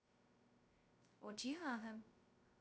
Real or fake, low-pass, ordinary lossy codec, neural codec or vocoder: fake; none; none; codec, 16 kHz, 0.2 kbps, FocalCodec